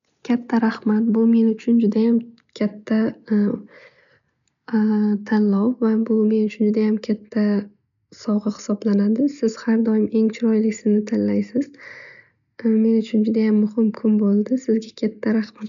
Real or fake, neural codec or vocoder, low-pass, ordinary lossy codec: real; none; 7.2 kHz; none